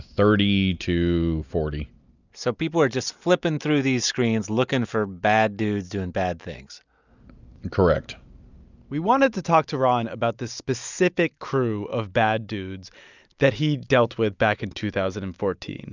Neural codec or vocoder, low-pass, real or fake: none; 7.2 kHz; real